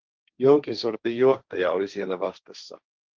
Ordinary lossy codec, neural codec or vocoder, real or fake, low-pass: Opus, 32 kbps; codec, 16 kHz, 1.1 kbps, Voila-Tokenizer; fake; 7.2 kHz